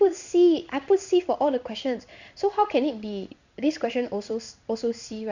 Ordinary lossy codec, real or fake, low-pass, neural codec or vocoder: none; fake; 7.2 kHz; codec, 16 kHz in and 24 kHz out, 1 kbps, XY-Tokenizer